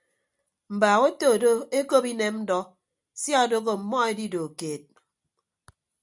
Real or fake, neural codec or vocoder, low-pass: real; none; 10.8 kHz